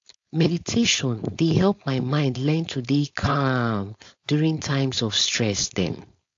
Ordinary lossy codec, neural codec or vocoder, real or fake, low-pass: AAC, 48 kbps; codec, 16 kHz, 4.8 kbps, FACodec; fake; 7.2 kHz